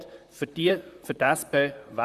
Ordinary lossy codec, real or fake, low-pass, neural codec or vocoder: Opus, 64 kbps; fake; 14.4 kHz; vocoder, 44.1 kHz, 128 mel bands, Pupu-Vocoder